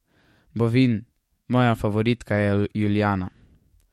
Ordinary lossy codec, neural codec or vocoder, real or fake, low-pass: MP3, 64 kbps; codec, 44.1 kHz, 7.8 kbps, DAC; fake; 19.8 kHz